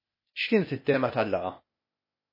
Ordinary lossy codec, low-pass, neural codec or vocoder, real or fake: MP3, 24 kbps; 5.4 kHz; codec, 16 kHz, 0.8 kbps, ZipCodec; fake